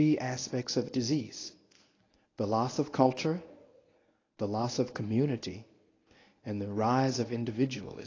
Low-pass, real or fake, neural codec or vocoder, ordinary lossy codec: 7.2 kHz; fake; codec, 24 kHz, 0.9 kbps, WavTokenizer, medium speech release version 1; AAC, 32 kbps